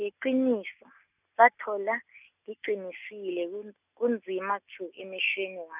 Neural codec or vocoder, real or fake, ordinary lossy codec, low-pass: none; real; none; 3.6 kHz